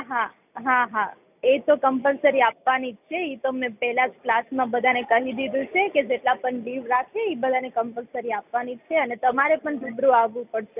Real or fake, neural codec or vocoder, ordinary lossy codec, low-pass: real; none; none; 3.6 kHz